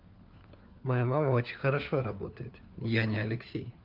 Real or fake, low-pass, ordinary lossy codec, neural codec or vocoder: fake; 5.4 kHz; Opus, 24 kbps; codec, 16 kHz, 4 kbps, FunCodec, trained on LibriTTS, 50 frames a second